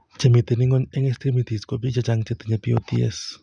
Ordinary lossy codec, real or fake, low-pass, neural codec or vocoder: none; real; 9.9 kHz; none